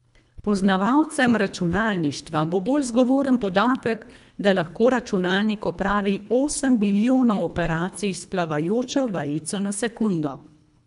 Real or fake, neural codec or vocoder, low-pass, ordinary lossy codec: fake; codec, 24 kHz, 1.5 kbps, HILCodec; 10.8 kHz; none